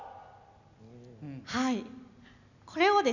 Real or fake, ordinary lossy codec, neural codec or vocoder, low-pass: real; none; none; 7.2 kHz